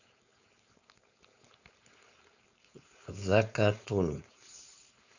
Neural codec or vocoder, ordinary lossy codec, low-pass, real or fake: codec, 16 kHz, 4.8 kbps, FACodec; none; 7.2 kHz; fake